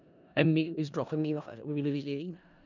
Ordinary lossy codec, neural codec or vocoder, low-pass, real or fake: none; codec, 16 kHz in and 24 kHz out, 0.4 kbps, LongCat-Audio-Codec, four codebook decoder; 7.2 kHz; fake